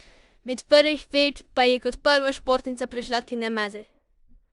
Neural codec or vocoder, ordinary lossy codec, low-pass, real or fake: codec, 16 kHz in and 24 kHz out, 0.9 kbps, LongCat-Audio-Codec, four codebook decoder; Opus, 64 kbps; 10.8 kHz; fake